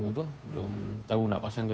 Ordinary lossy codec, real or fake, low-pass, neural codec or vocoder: none; fake; none; codec, 16 kHz, 2 kbps, FunCodec, trained on Chinese and English, 25 frames a second